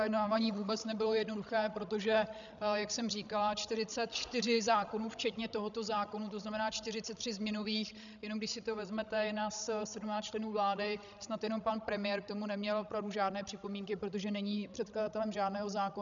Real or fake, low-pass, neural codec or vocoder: fake; 7.2 kHz; codec, 16 kHz, 16 kbps, FreqCodec, larger model